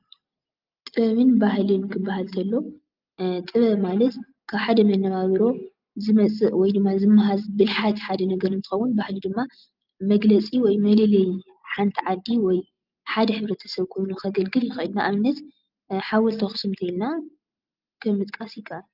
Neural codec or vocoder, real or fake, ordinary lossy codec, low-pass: none; real; Opus, 24 kbps; 5.4 kHz